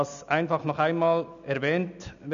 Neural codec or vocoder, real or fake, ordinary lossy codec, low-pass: none; real; none; 7.2 kHz